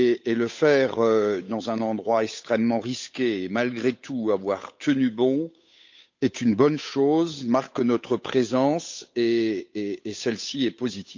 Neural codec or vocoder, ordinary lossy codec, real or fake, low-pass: codec, 16 kHz, 8 kbps, FunCodec, trained on Chinese and English, 25 frames a second; MP3, 64 kbps; fake; 7.2 kHz